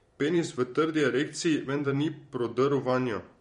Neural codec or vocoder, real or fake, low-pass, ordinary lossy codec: vocoder, 44.1 kHz, 128 mel bands every 512 samples, BigVGAN v2; fake; 19.8 kHz; MP3, 48 kbps